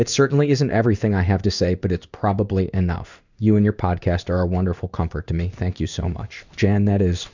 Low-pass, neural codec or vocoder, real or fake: 7.2 kHz; codec, 16 kHz in and 24 kHz out, 1 kbps, XY-Tokenizer; fake